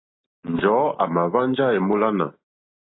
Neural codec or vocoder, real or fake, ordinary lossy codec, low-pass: none; real; AAC, 16 kbps; 7.2 kHz